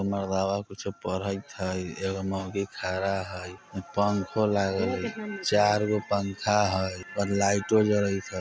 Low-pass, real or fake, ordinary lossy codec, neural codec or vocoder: none; real; none; none